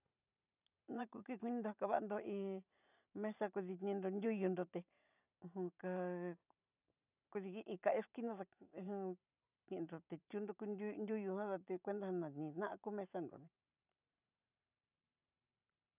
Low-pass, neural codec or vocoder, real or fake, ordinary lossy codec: 3.6 kHz; vocoder, 44.1 kHz, 128 mel bands every 512 samples, BigVGAN v2; fake; none